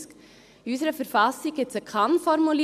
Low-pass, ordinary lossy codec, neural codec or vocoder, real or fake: 14.4 kHz; MP3, 96 kbps; none; real